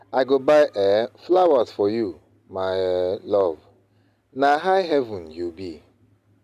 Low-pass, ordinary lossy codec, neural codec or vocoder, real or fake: 14.4 kHz; none; none; real